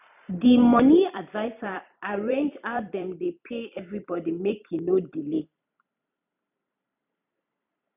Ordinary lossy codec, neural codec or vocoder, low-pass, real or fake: none; vocoder, 44.1 kHz, 128 mel bands every 256 samples, BigVGAN v2; 3.6 kHz; fake